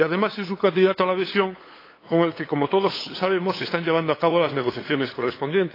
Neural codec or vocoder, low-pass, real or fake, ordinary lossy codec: codec, 16 kHz, 8 kbps, FunCodec, trained on LibriTTS, 25 frames a second; 5.4 kHz; fake; AAC, 24 kbps